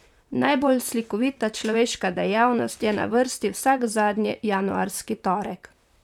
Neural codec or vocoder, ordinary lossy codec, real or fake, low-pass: vocoder, 44.1 kHz, 128 mel bands, Pupu-Vocoder; none; fake; 19.8 kHz